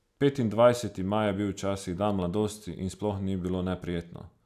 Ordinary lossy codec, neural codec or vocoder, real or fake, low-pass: none; none; real; 14.4 kHz